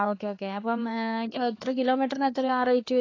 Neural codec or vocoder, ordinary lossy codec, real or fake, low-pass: codec, 16 kHz, 4 kbps, X-Codec, HuBERT features, trained on LibriSpeech; AAC, 32 kbps; fake; 7.2 kHz